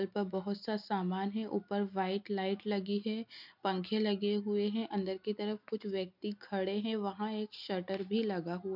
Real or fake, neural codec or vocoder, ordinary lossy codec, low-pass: real; none; MP3, 48 kbps; 5.4 kHz